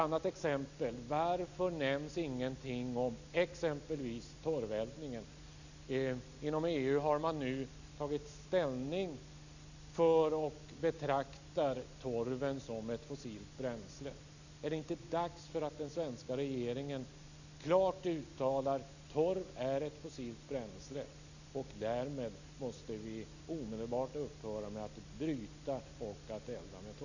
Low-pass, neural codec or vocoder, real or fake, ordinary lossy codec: 7.2 kHz; none; real; none